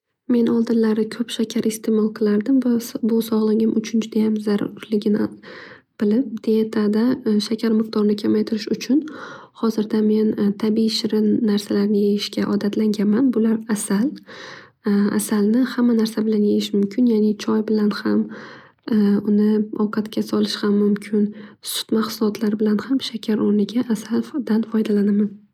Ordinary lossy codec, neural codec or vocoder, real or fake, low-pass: none; none; real; 19.8 kHz